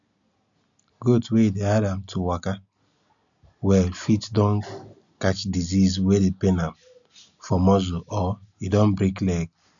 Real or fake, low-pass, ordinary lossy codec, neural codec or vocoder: real; 7.2 kHz; MP3, 96 kbps; none